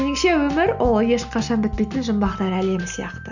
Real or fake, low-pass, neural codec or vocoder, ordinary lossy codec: real; 7.2 kHz; none; none